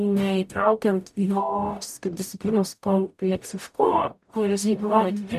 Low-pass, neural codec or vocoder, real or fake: 14.4 kHz; codec, 44.1 kHz, 0.9 kbps, DAC; fake